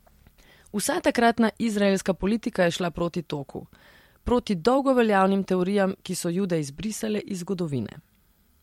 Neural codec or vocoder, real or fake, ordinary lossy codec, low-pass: vocoder, 44.1 kHz, 128 mel bands every 256 samples, BigVGAN v2; fake; MP3, 64 kbps; 19.8 kHz